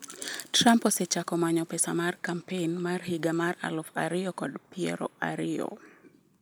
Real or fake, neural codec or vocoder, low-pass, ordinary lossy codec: real; none; none; none